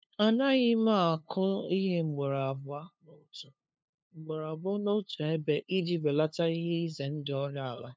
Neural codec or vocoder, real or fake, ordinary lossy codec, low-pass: codec, 16 kHz, 2 kbps, FunCodec, trained on LibriTTS, 25 frames a second; fake; none; none